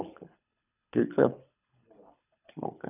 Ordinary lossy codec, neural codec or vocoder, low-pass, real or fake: none; codec, 24 kHz, 3 kbps, HILCodec; 3.6 kHz; fake